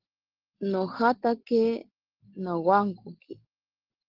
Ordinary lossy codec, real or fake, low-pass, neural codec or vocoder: Opus, 16 kbps; real; 5.4 kHz; none